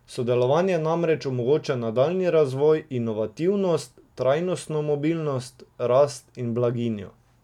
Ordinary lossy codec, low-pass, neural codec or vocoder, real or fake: none; 19.8 kHz; none; real